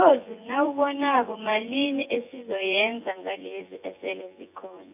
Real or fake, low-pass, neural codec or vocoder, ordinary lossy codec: fake; 3.6 kHz; vocoder, 24 kHz, 100 mel bands, Vocos; AAC, 32 kbps